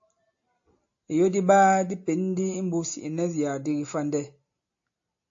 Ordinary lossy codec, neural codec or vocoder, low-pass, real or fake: AAC, 48 kbps; none; 7.2 kHz; real